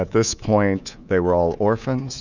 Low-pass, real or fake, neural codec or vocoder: 7.2 kHz; fake; codec, 24 kHz, 3.1 kbps, DualCodec